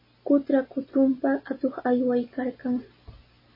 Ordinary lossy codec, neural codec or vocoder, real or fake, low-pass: MP3, 24 kbps; none; real; 5.4 kHz